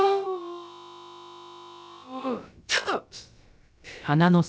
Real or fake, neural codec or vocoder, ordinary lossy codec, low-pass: fake; codec, 16 kHz, about 1 kbps, DyCAST, with the encoder's durations; none; none